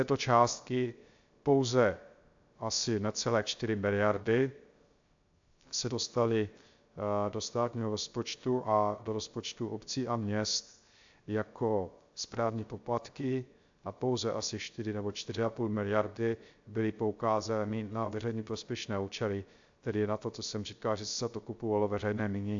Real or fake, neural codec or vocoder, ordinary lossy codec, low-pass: fake; codec, 16 kHz, 0.3 kbps, FocalCodec; AAC, 64 kbps; 7.2 kHz